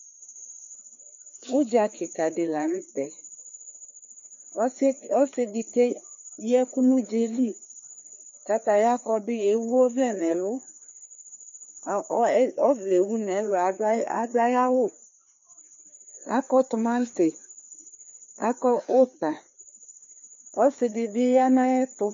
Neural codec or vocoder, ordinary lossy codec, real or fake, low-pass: codec, 16 kHz, 2 kbps, FreqCodec, larger model; MP3, 48 kbps; fake; 7.2 kHz